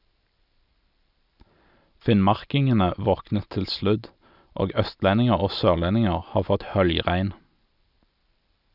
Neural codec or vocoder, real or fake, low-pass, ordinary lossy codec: none; real; 5.4 kHz; none